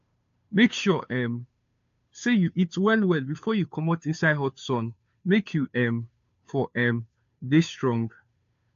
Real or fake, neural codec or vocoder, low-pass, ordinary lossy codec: fake; codec, 16 kHz, 2 kbps, FunCodec, trained on Chinese and English, 25 frames a second; 7.2 kHz; AAC, 64 kbps